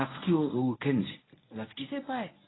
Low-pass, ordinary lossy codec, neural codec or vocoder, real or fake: 7.2 kHz; AAC, 16 kbps; codec, 24 kHz, 0.9 kbps, WavTokenizer, medium speech release version 1; fake